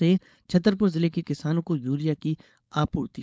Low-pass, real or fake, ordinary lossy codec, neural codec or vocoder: none; fake; none; codec, 16 kHz, 4.8 kbps, FACodec